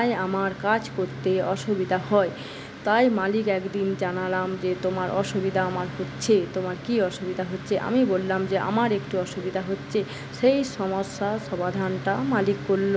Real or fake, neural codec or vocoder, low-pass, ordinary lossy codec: real; none; none; none